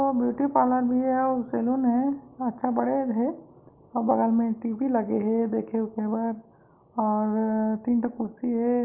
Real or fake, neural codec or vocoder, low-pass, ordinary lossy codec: real; none; 3.6 kHz; Opus, 32 kbps